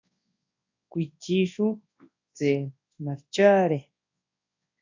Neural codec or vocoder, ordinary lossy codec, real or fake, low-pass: codec, 24 kHz, 0.9 kbps, WavTokenizer, large speech release; AAC, 48 kbps; fake; 7.2 kHz